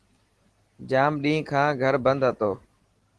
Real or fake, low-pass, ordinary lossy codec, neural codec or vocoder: real; 10.8 kHz; Opus, 16 kbps; none